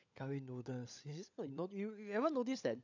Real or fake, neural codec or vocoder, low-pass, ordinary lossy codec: fake; codec, 16 kHz, 16 kbps, FunCodec, trained on LibriTTS, 50 frames a second; 7.2 kHz; none